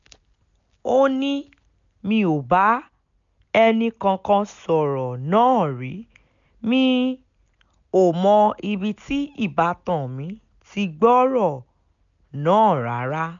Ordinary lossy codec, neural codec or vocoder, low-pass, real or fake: none; none; 7.2 kHz; real